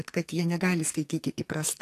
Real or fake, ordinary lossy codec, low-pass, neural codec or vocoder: fake; AAC, 64 kbps; 14.4 kHz; codec, 44.1 kHz, 2.6 kbps, SNAC